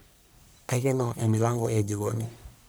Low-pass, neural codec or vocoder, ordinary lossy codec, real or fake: none; codec, 44.1 kHz, 1.7 kbps, Pupu-Codec; none; fake